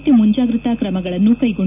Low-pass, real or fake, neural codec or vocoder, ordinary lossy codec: 3.6 kHz; real; none; none